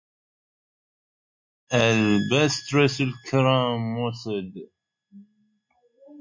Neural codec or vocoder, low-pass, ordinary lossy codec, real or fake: none; 7.2 kHz; MP3, 48 kbps; real